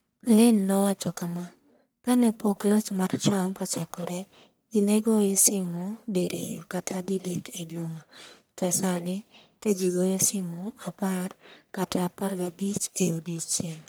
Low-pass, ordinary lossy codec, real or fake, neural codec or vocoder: none; none; fake; codec, 44.1 kHz, 1.7 kbps, Pupu-Codec